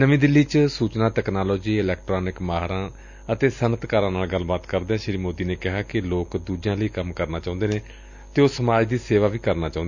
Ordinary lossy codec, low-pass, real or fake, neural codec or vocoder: none; 7.2 kHz; real; none